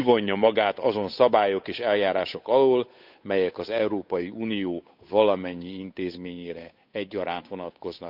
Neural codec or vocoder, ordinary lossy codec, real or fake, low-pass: codec, 16 kHz, 8 kbps, FunCodec, trained on Chinese and English, 25 frames a second; none; fake; 5.4 kHz